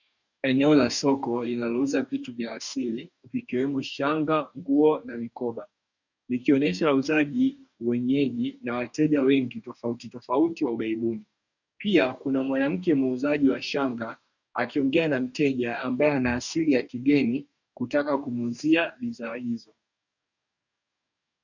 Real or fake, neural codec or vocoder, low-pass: fake; codec, 44.1 kHz, 2.6 kbps, DAC; 7.2 kHz